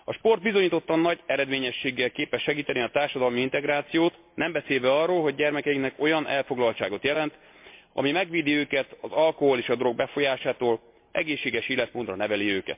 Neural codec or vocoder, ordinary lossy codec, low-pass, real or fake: none; MP3, 32 kbps; 3.6 kHz; real